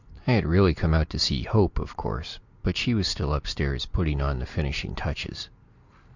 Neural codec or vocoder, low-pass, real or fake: none; 7.2 kHz; real